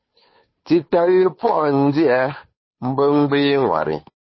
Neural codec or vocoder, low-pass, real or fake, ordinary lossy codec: codec, 16 kHz, 2 kbps, FunCodec, trained on Chinese and English, 25 frames a second; 7.2 kHz; fake; MP3, 24 kbps